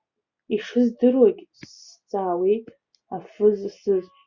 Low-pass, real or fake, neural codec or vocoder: 7.2 kHz; real; none